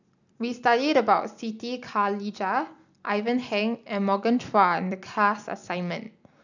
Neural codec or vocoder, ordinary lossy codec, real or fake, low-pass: none; none; real; 7.2 kHz